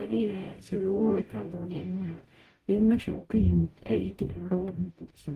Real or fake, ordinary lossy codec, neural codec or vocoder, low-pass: fake; Opus, 24 kbps; codec, 44.1 kHz, 0.9 kbps, DAC; 14.4 kHz